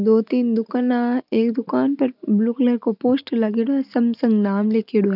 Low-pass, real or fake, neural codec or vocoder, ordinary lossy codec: 5.4 kHz; real; none; none